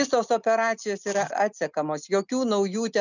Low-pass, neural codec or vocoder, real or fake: 7.2 kHz; none; real